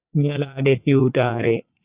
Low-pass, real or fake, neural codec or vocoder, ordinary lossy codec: 3.6 kHz; fake; vocoder, 44.1 kHz, 80 mel bands, Vocos; Opus, 64 kbps